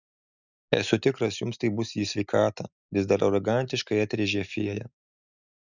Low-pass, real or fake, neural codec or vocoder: 7.2 kHz; real; none